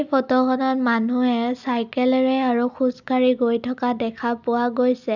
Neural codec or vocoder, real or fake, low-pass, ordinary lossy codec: none; real; 7.2 kHz; none